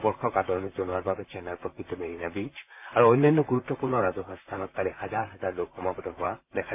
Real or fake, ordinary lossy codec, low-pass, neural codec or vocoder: fake; MP3, 32 kbps; 3.6 kHz; vocoder, 44.1 kHz, 128 mel bands, Pupu-Vocoder